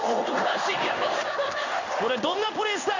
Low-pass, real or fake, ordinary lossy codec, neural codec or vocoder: 7.2 kHz; fake; none; codec, 16 kHz in and 24 kHz out, 1 kbps, XY-Tokenizer